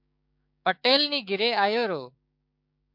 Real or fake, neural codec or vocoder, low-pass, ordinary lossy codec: fake; codec, 16 kHz, 6 kbps, DAC; 5.4 kHz; MP3, 48 kbps